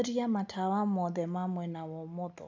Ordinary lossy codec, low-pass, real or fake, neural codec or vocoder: none; none; real; none